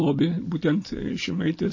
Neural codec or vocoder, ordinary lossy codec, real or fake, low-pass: none; MP3, 32 kbps; real; 7.2 kHz